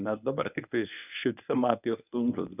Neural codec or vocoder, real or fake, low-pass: codec, 24 kHz, 0.9 kbps, WavTokenizer, medium speech release version 1; fake; 3.6 kHz